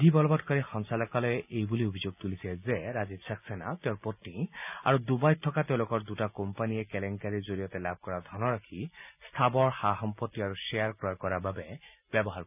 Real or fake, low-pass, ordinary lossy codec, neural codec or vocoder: real; 3.6 kHz; none; none